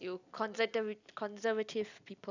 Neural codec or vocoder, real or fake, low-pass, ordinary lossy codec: none; real; 7.2 kHz; none